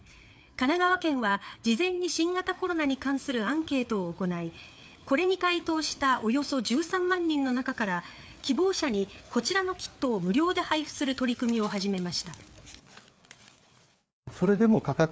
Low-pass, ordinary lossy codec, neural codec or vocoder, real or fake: none; none; codec, 16 kHz, 4 kbps, FreqCodec, larger model; fake